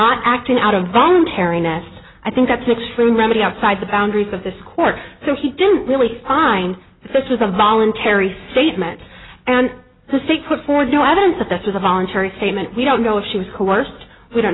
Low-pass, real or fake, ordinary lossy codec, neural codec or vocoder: 7.2 kHz; real; AAC, 16 kbps; none